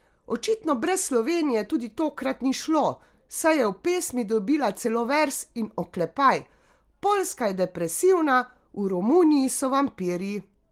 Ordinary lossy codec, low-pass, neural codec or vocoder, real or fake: Opus, 24 kbps; 19.8 kHz; none; real